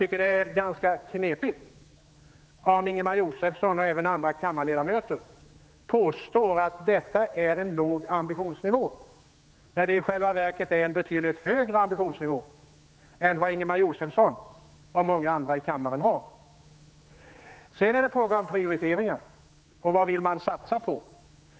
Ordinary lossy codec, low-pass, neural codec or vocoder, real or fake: none; none; codec, 16 kHz, 4 kbps, X-Codec, HuBERT features, trained on general audio; fake